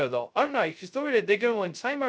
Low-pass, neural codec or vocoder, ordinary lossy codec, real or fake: none; codec, 16 kHz, 0.2 kbps, FocalCodec; none; fake